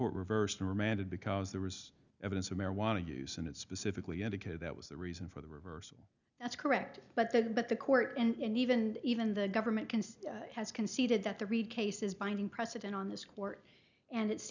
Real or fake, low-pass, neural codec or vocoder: real; 7.2 kHz; none